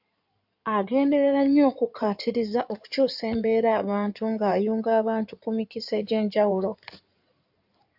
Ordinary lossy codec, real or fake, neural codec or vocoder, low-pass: AAC, 48 kbps; fake; codec, 16 kHz in and 24 kHz out, 2.2 kbps, FireRedTTS-2 codec; 5.4 kHz